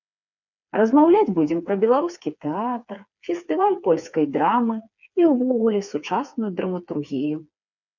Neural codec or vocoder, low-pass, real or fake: codec, 16 kHz, 4 kbps, FreqCodec, smaller model; 7.2 kHz; fake